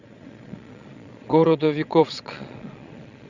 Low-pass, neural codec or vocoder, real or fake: 7.2 kHz; vocoder, 22.05 kHz, 80 mel bands, Vocos; fake